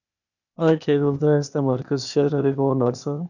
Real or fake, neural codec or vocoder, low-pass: fake; codec, 16 kHz, 0.8 kbps, ZipCodec; 7.2 kHz